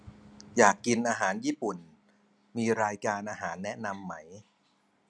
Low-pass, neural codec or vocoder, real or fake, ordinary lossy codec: none; none; real; none